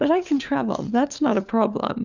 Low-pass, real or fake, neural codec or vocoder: 7.2 kHz; fake; codec, 16 kHz, 4 kbps, FunCodec, trained on LibriTTS, 50 frames a second